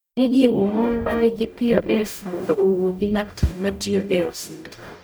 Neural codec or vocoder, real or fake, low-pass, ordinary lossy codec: codec, 44.1 kHz, 0.9 kbps, DAC; fake; none; none